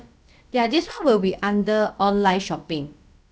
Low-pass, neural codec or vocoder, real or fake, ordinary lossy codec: none; codec, 16 kHz, about 1 kbps, DyCAST, with the encoder's durations; fake; none